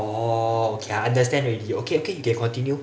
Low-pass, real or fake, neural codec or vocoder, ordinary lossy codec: none; real; none; none